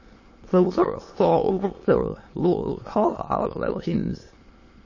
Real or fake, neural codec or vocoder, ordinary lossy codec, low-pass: fake; autoencoder, 22.05 kHz, a latent of 192 numbers a frame, VITS, trained on many speakers; MP3, 32 kbps; 7.2 kHz